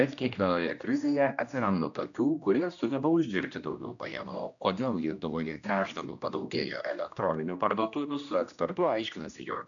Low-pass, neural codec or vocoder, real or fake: 7.2 kHz; codec, 16 kHz, 1 kbps, X-Codec, HuBERT features, trained on general audio; fake